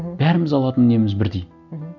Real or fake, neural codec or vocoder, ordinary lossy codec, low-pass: real; none; none; 7.2 kHz